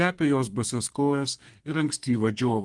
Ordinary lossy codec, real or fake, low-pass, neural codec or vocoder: Opus, 24 kbps; fake; 10.8 kHz; codec, 32 kHz, 1.9 kbps, SNAC